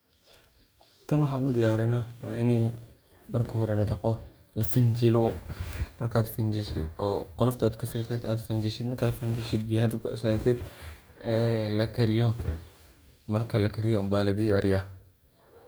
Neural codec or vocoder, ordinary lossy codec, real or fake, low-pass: codec, 44.1 kHz, 2.6 kbps, DAC; none; fake; none